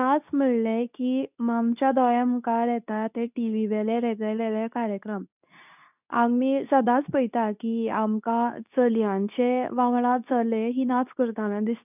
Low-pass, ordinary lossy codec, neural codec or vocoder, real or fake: 3.6 kHz; none; codec, 24 kHz, 0.9 kbps, WavTokenizer, medium speech release version 2; fake